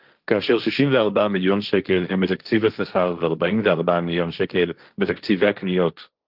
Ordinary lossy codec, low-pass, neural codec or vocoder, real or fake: Opus, 32 kbps; 5.4 kHz; codec, 16 kHz, 1.1 kbps, Voila-Tokenizer; fake